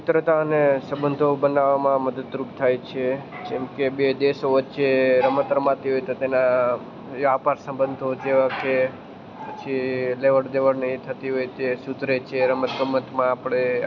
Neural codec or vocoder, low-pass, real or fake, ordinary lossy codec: none; 7.2 kHz; real; none